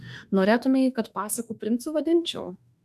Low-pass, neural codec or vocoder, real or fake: 14.4 kHz; autoencoder, 48 kHz, 32 numbers a frame, DAC-VAE, trained on Japanese speech; fake